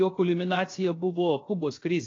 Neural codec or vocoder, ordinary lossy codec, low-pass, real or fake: codec, 16 kHz, 0.7 kbps, FocalCodec; AAC, 32 kbps; 7.2 kHz; fake